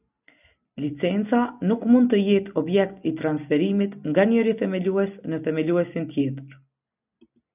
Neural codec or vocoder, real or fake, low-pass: none; real; 3.6 kHz